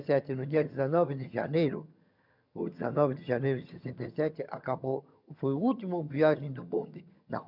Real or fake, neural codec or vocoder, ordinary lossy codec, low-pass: fake; vocoder, 22.05 kHz, 80 mel bands, HiFi-GAN; none; 5.4 kHz